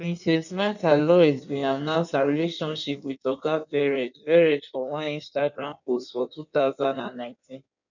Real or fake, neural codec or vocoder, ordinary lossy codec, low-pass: fake; codec, 16 kHz in and 24 kHz out, 1.1 kbps, FireRedTTS-2 codec; none; 7.2 kHz